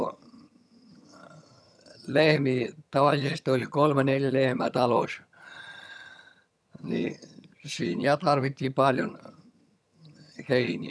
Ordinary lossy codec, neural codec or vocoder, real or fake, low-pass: none; vocoder, 22.05 kHz, 80 mel bands, HiFi-GAN; fake; none